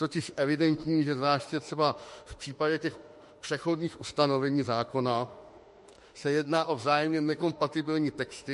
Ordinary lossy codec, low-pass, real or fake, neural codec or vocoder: MP3, 48 kbps; 14.4 kHz; fake; autoencoder, 48 kHz, 32 numbers a frame, DAC-VAE, trained on Japanese speech